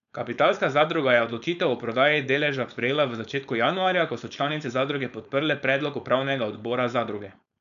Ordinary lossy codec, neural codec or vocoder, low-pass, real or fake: none; codec, 16 kHz, 4.8 kbps, FACodec; 7.2 kHz; fake